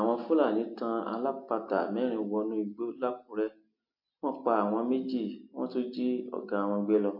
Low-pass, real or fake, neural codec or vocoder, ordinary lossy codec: 5.4 kHz; real; none; MP3, 32 kbps